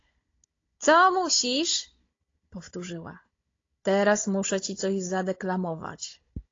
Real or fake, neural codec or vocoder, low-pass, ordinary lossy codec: fake; codec, 16 kHz, 16 kbps, FunCodec, trained on Chinese and English, 50 frames a second; 7.2 kHz; AAC, 32 kbps